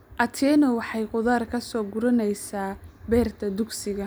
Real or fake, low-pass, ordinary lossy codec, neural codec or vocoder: real; none; none; none